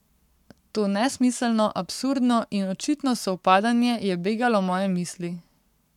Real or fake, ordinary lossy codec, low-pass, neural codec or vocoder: fake; none; 19.8 kHz; codec, 44.1 kHz, 7.8 kbps, Pupu-Codec